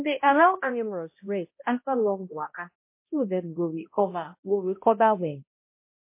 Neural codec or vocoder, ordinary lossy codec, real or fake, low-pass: codec, 16 kHz, 0.5 kbps, X-Codec, HuBERT features, trained on balanced general audio; MP3, 24 kbps; fake; 3.6 kHz